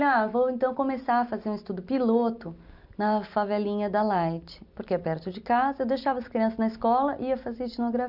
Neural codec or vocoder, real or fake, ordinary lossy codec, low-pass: none; real; none; 5.4 kHz